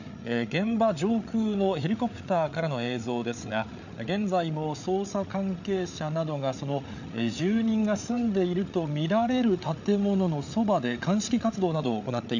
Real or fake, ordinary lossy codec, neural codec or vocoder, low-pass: fake; none; codec, 16 kHz, 8 kbps, FreqCodec, larger model; 7.2 kHz